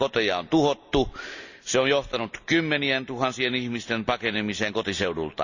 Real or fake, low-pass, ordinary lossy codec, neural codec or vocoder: real; 7.2 kHz; none; none